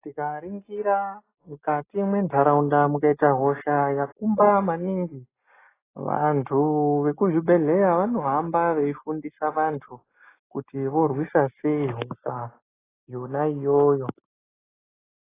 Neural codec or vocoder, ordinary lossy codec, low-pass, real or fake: none; AAC, 16 kbps; 3.6 kHz; real